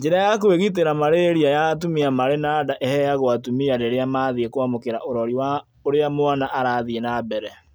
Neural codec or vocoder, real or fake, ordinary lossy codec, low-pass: none; real; none; none